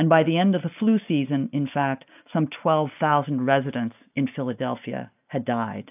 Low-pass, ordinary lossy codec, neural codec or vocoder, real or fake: 3.6 kHz; AAC, 32 kbps; none; real